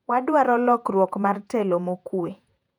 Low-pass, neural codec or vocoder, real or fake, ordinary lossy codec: 19.8 kHz; vocoder, 48 kHz, 128 mel bands, Vocos; fake; none